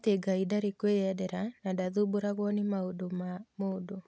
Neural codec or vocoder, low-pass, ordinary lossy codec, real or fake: none; none; none; real